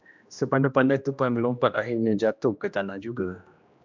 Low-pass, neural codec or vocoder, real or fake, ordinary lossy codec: 7.2 kHz; codec, 16 kHz, 1 kbps, X-Codec, HuBERT features, trained on general audio; fake; MP3, 64 kbps